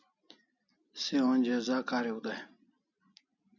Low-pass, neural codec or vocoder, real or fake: 7.2 kHz; none; real